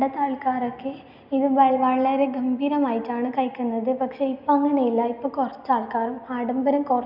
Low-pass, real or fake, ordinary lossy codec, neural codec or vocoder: 5.4 kHz; real; none; none